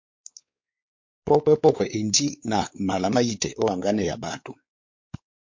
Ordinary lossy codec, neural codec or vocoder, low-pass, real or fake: MP3, 48 kbps; codec, 16 kHz, 4 kbps, X-Codec, WavLM features, trained on Multilingual LibriSpeech; 7.2 kHz; fake